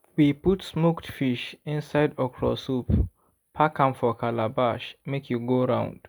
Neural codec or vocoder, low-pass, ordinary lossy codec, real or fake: none; none; none; real